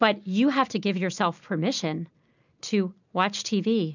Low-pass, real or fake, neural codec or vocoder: 7.2 kHz; real; none